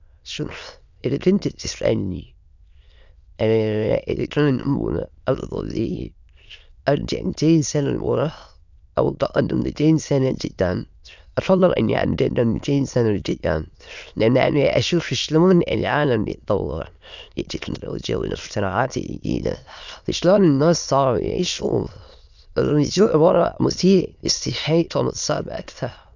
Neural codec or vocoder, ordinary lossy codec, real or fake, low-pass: autoencoder, 22.05 kHz, a latent of 192 numbers a frame, VITS, trained on many speakers; none; fake; 7.2 kHz